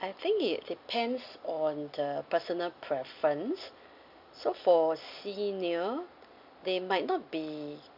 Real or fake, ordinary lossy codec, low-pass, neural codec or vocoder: real; none; 5.4 kHz; none